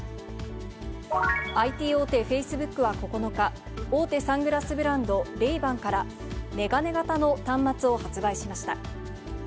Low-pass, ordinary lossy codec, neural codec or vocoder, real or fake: none; none; none; real